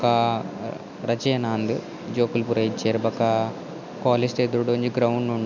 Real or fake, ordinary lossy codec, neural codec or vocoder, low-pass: real; none; none; 7.2 kHz